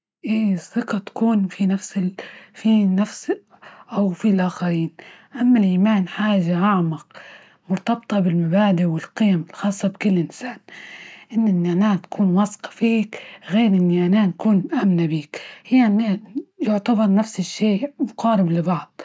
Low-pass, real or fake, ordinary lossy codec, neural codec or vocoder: none; real; none; none